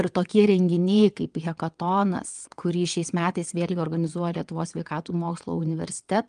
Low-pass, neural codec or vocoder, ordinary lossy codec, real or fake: 9.9 kHz; vocoder, 22.05 kHz, 80 mel bands, WaveNeXt; Opus, 32 kbps; fake